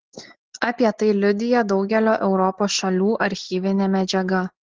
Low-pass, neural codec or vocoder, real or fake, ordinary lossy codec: 7.2 kHz; none; real; Opus, 16 kbps